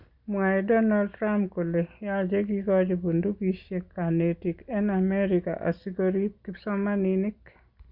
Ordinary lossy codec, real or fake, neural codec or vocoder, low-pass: Opus, 64 kbps; real; none; 5.4 kHz